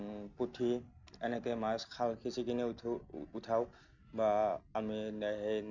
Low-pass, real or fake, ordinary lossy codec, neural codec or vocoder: 7.2 kHz; real; none; none